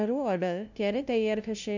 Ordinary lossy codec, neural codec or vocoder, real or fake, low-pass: none; codec, 16 kHz, 0.5 kbps, FunCodec, trained on LibriTTS, 25 frames a second; fake; 7.2 kHz